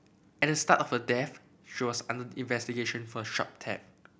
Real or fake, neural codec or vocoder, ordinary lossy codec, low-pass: real; none; none; none